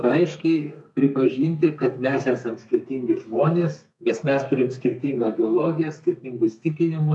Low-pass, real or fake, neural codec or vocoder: 10.8 kHz; fake; codec, 32 kHz, 1.9 kbps, SNAC